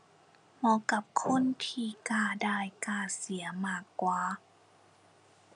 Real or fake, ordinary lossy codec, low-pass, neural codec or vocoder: real; none; 9.9 kHz; none